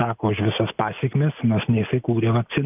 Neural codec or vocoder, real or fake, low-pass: none; real; 3.6 kHz